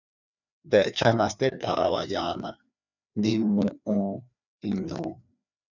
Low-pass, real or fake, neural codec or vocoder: 7.2 kHz; fake; codec, 16 kHz, 2 kbps, FreqCodec, larger model